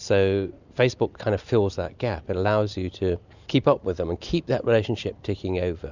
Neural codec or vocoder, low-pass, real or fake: none; 7.2 kHz; real